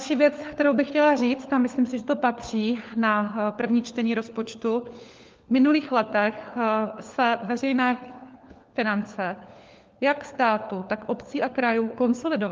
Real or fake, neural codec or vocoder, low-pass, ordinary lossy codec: fake; codec, 16 kHz, 4 kbps, FunCodec, trained on LibriTTS, 50 frames a second; 7.2 kHz; Opus, 24 kbps